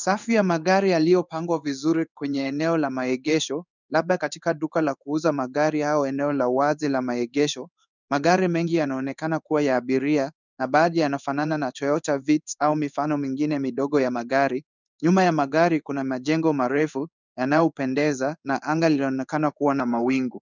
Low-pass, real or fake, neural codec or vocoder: 7.2 kHz; fake; codec, 16 kHz in and 24 kHz out, 1 kbps, XY-Tokenizer